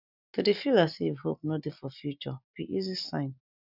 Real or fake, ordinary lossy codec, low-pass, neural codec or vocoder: real; none; 5.4 kHz; none